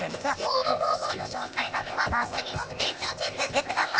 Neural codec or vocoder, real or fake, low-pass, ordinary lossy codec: codec, 16 kHz, 0.8 kbps, ZipCodec; fake; none; none